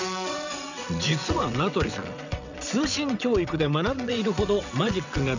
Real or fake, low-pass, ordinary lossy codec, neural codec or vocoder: fake; 7.2 kHz; none; vocoder, 22.05 kHz, 80 mel bands, WaveNeXt